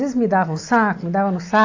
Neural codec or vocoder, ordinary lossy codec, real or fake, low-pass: none; AAC, 48 kbps; real; 7.2 kHz